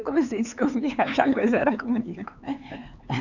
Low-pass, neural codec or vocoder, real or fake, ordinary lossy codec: 7.2 kHz; codec, 16 kHz, 4 kbps, X-Codec, HuBERT features, trained on LibriSpeech; fake; none